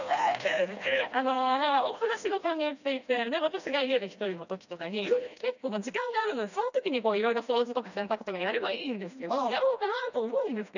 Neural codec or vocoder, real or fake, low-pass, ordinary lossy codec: codec, 16 kHz, 1 kbps, FreqCodec, smaller model; fake; 7.2 kHz; none